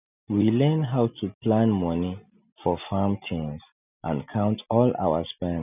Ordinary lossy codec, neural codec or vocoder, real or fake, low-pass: none; none; real; 3.6 kHz